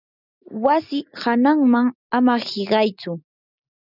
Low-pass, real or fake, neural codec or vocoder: 5.4 kHz; real; none